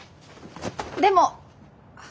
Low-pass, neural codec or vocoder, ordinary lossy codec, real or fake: none; none; none; real